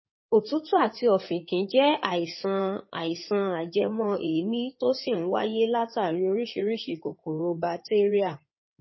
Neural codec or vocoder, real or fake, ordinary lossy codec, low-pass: codec, 16 kHz in and 24 kHz out, 2.2 kbps, FireRedTTS-2 codec; fake; MP3, 24 kbps; 7.2 kHz